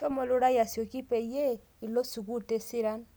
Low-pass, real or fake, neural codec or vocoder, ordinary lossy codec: none; real; none; none